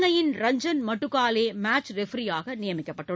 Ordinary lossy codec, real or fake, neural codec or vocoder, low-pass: none; real; none; none